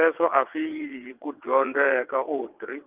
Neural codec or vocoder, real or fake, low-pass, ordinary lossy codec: vocoder, 22.05 kHz, 80 mel bands, WaveNeXt; fake; 3.6 kHz; Opus, 24 kbps